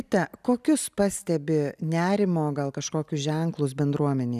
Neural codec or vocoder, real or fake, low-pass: none; real; 14.4 kHz